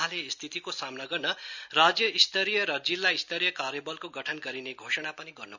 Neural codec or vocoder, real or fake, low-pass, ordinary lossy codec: none; real; 7.2 kHz; none